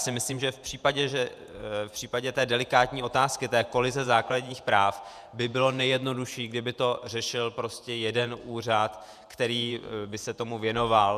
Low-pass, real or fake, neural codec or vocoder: 14.4 kHz; fake; vocoder, 48 kHz, 128 mel bands, Vocos